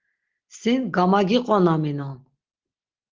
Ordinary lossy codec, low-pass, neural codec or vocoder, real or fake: Opus, 16 kbps; 7.2 kHz; none; real